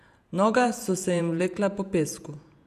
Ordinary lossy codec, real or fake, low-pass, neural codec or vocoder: none; fake; 14.4 kHz; vocoder, 48 kHz, 128 mel bands, Vocos